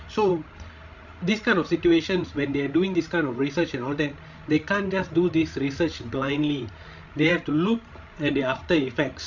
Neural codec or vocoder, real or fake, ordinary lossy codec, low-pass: codec, 16 kHz, 16 kbps, FreqCodec, larger model; fake; none; 7.2 kHz